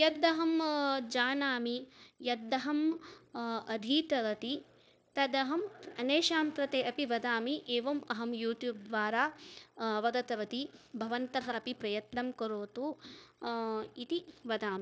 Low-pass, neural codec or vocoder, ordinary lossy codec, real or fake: none; codec, 16 kHz, 0.9 kbps, LongCat-Audio-Codec; none; fake